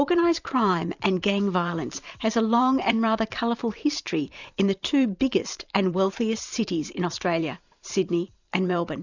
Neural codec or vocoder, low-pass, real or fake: vocoder, 44.1 kHz, 128 mel bands every 512 samples, BigVGAN v2; 7.2 kHz; fake